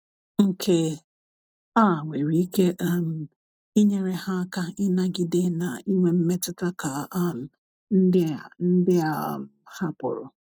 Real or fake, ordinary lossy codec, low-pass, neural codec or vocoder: real; none; none; none